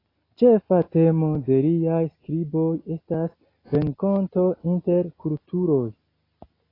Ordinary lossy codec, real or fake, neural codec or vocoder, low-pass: AAC, 24 kbps; real; none; 5.4 kHz